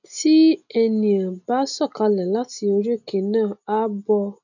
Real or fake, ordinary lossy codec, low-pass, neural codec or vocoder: real; none; 7.2 kHz; none